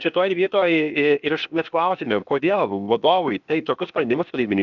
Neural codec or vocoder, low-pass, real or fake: codec, 16 kHz, 0.8 kbps, ZipCodec; 7.2 kHz; fake